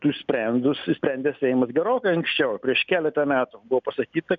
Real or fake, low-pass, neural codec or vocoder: real; 7.2 kHz; none